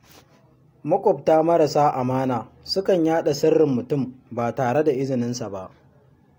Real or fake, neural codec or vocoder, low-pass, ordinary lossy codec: real; none; 19.8 kHz; AAC, 48 kbps